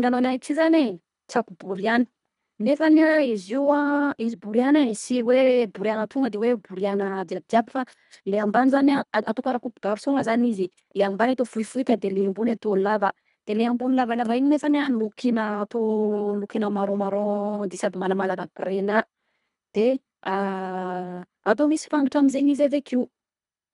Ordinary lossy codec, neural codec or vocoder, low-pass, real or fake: none; codec, 24 kHz, 1.5 kbps, HILCodec; 10.8 kHz; fake